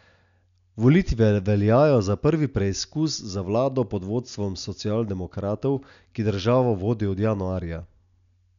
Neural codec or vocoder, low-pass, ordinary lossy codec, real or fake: none; 7.2 kHz; MP3, 96 kbps; real